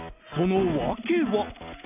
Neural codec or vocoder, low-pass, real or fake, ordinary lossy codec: none; 3.6 kHz; real; none